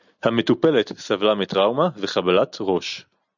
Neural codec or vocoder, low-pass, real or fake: none; 7.2 kHz; real